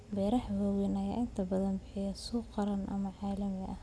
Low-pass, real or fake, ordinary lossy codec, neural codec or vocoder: none; real; none; none